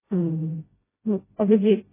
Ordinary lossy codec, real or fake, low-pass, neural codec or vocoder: MP3, 16 kbps; fake; 3.6 kHz; codec, 16 kHz, 0.5 kbps, FreqCodec, smaller model